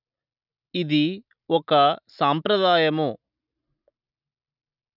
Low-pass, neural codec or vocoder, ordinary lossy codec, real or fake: 5.4 kHz; none; none; real